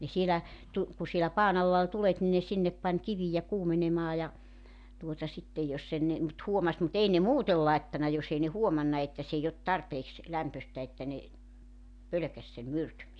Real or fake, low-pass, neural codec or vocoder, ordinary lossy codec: real; 9.9 kHz; none; none